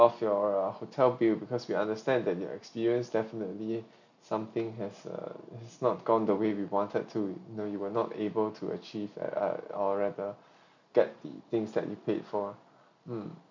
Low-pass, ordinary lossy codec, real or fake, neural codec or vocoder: 7.2 kHz; none; real; none